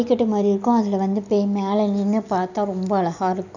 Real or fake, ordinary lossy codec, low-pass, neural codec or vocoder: real; none; 7.2 kHz; none